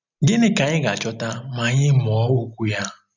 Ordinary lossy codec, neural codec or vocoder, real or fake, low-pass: none; none; real; 7.2 kHz